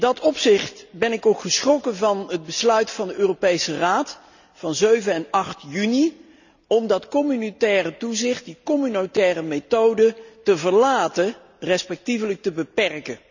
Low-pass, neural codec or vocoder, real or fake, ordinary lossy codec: 7.2 kHz; none; real; none